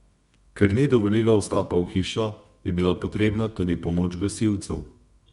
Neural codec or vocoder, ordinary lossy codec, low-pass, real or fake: codec, 24 kHz, 0.9 kbps, WavTokenizer, medium music audio release; Opus, 64 kbps; 10.8 kHz; fake